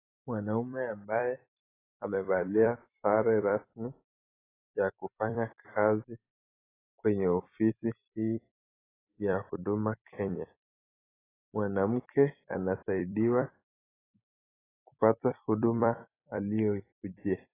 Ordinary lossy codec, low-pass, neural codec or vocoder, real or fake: AAC, 16 kbps; 3.6 kHz; vocoder, 44.1 kHz, 128 mel bands every 256 samples, BigVGAN v2; fake